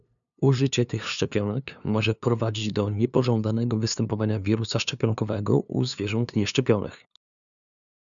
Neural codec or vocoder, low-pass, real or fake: codec, 16 kHz, 2 kbps, FunCodec, trained on LibriTTS, 25 frames a second; 7.2 kHz; fake